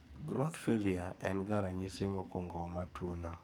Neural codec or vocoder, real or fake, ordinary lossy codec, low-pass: codec, 44.1 kHz, 2.6 kbps, SNAC; fake; none; none